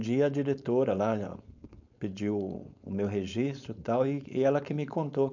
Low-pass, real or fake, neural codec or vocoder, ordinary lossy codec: 7.2 kHz; fake; codec, 16 kHz, 4.8 kbps, FACodec; none